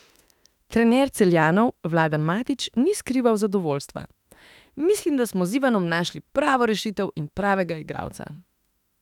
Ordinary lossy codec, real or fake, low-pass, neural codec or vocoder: none; fake; 19.8 kHz; autoencoder, 48 kHz, 32 numbers a frame, DAC-VAE, trained on Japanese speech